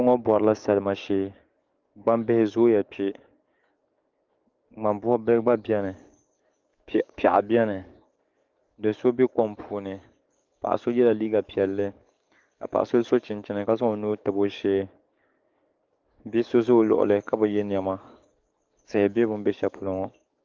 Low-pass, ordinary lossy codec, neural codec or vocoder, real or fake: 7.2 kHz; Opus, 32 kbps; codec, 44.1 kHz, 7.8 kbps, DAC; fake